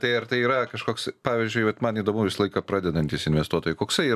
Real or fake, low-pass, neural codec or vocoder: real; 14.4 kHz; none